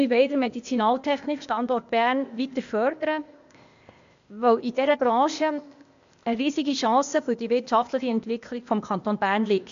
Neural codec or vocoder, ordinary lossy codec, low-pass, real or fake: codec, 16 kHz, 0.8 kbps, ZipCodec; MP3, 64 kbps; 7.2 kHz; fake